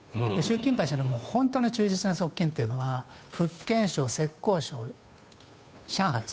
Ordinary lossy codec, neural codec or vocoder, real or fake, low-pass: none; codec, 16 kHz, 2 kbps, FunCodec, trained on Chinese and English, 25 frames a second; fake; none